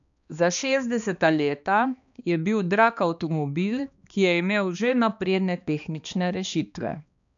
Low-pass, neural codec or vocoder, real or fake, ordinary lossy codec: 7.2 kHz; codec, 16 kHz, 2 kbps, X-Codec, HuBERT features, trained on balanced general audio; fake; none